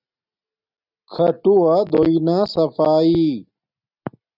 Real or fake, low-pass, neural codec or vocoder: real; 5.4 kHz; none